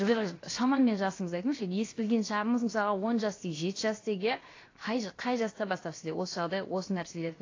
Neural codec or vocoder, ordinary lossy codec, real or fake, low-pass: codec, 16 kHz, 0.7 kbps, FocalCodec; AAC, 32 kbps; fake; 7.2 kHz